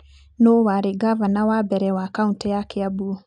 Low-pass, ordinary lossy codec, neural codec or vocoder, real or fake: 9.9 kHz; none; none; real